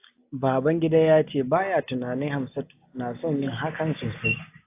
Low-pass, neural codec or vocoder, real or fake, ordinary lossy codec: 3.6 kHz; none; real; AAC, 24 kbps